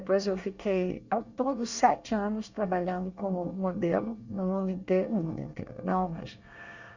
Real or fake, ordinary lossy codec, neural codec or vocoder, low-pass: fake; Opus, 64 kbps; codec, 24 kHz, 1 kbps, SNAC; 7.2 kHz